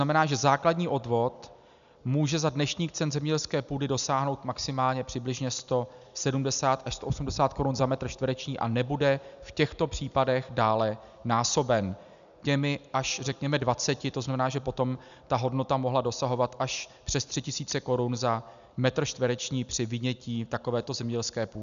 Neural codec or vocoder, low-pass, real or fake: none; 7.2 kHz; real